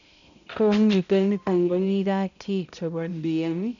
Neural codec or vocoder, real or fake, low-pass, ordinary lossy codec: codec, 16 kHz, 0.5 kbps, X-Codec, HuBERT features, trained on balanced general audio; fake; 7.2 kHz; none